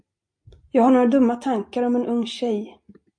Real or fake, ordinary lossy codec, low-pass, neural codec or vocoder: real; MP3, 48 kbps; 9.9 kHz; none